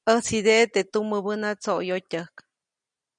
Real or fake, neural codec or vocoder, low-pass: real; none; 9.9 kHz